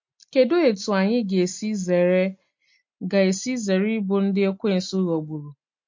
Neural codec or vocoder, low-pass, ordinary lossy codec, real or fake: none; 7.2 kHz; MP3, 48 kbps; real